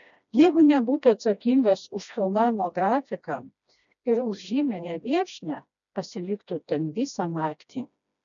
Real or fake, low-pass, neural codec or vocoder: fake; 7.2 kHz; codec, 16 kHz, 1 kbps, FreqCodec, smaller model